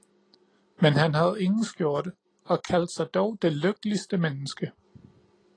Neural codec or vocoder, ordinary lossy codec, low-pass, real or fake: none; AAC, 32 kbps; 9.9 kHz; real